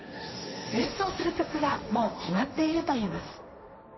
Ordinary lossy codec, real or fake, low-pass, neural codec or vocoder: MP3, 24 kbps; fake; 7.2 kHz; codec, 16 kHz, 1.1 kbps, Voila-Tokenizer